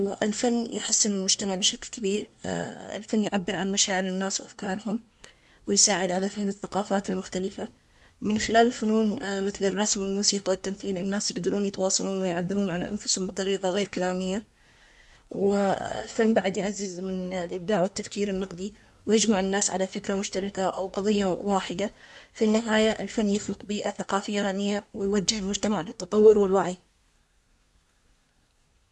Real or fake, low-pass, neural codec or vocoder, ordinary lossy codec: fake; none; codec, 24 kHz, 1 kbps, SNAC; none